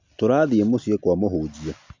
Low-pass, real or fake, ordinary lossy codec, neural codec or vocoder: 7.2 kHz; real; MP3, 48 kbps; none